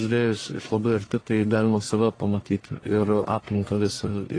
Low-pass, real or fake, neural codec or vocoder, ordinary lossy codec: 9.9 kHz; fake; codec, 44.1 kHz, 1.7 kbps, Pupu-Codec; AAC, 32 kbps